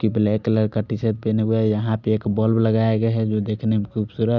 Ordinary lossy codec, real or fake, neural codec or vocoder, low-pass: none; real; none; 7.2 kHz